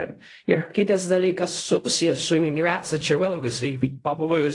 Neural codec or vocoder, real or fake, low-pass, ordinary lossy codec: codec, 16 kHz in and 24 kHz out, 0.4 kbps, LongCat-Audio-Codec, fine tuned four codebook decoder; fake; 10.8 kHz; AAC, 48 kbps